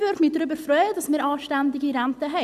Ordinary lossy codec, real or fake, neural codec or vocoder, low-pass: none; real; none; 14.4 kHz